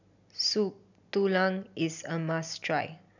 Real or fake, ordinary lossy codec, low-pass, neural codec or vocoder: real; none; 7.2 kHz; none